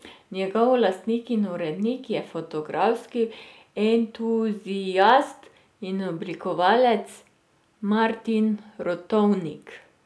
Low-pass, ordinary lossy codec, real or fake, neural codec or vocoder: none; none; real; none